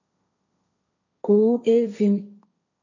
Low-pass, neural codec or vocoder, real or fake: 7.2 kHz; codec, 16 kHz, 1.1 kbps, Voila-Tokenizer; fake